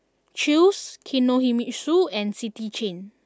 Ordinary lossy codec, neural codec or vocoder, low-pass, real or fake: none; none; none; real